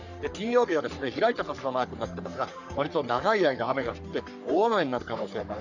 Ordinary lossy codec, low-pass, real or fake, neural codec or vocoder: none; 7.2 kHz; fake; codec, 44.1 kHz, 3.4 kbps, Pupu-Codec